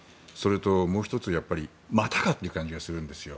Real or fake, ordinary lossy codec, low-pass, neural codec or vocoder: real; none; none; none